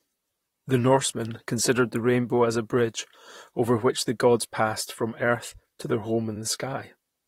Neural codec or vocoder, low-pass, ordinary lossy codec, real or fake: vocoder, 44.1 kHz, 128 mel bands, Pupu-Vocoder; 19.8 kHz; AAC, 48 kbps; fake